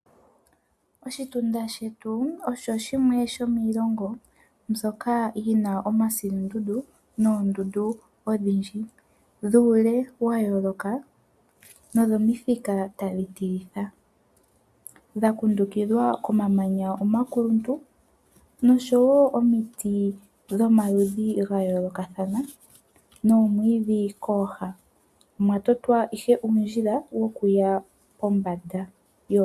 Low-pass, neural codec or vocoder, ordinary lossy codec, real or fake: 14.4 kHz; none; AAC, 96 kbps; real